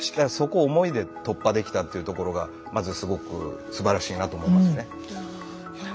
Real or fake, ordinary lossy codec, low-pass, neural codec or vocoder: real; none; none; none